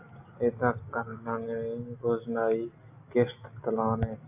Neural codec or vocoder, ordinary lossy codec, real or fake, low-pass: none; AAC, 32 kbps; real; 3.6 kHz